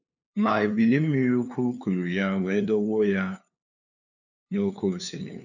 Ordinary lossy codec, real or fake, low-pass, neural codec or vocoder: none; fake; 7.2 kHz; codec, 16 kHz, 2 kbps, FunCodec, trained on LibriTTS, 25 frames a second